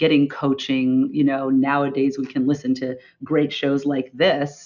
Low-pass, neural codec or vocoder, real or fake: 7.2 kHz; none; real